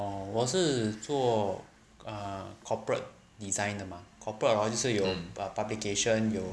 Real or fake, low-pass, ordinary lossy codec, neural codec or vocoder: real; none; none; none